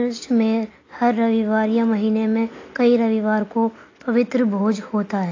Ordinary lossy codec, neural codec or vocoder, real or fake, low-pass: AAC, 32 kbps; none; real; 7.2 kHz